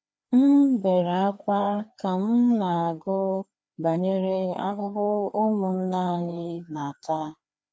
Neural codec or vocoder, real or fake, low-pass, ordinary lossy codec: codec, 16 kHz, 2 kbps, FreqCodec, larger model; fake; none; none